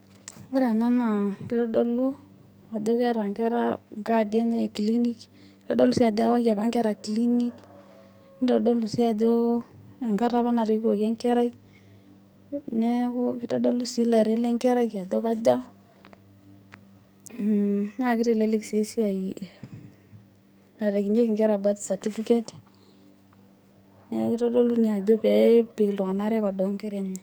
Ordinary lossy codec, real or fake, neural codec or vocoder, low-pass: none; fake; codec, 44.1 kHz, 2.6 kbps, SNAC; none